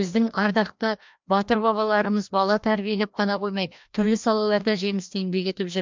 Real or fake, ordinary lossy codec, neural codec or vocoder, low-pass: fake; MP3, 64 kbps; codec, 16 kHz, 1 kbps, FreqCodec, larger model; 7.2 kHz